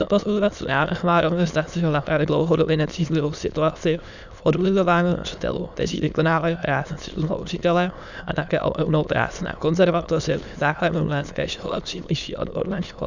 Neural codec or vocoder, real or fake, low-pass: autoencoder, 22.05 kHz, a latent of 192 numbers a frame, VITS, trained on many speakers; fake; 7.2 kHz